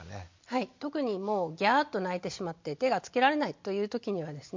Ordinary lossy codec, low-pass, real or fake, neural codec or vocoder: MP3, 48 kbps; 7.2 kHz; real; none